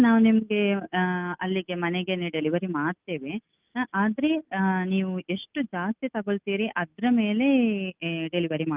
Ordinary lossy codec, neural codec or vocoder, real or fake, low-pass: Opus, 32 kbps; none; real; 3.6 kHz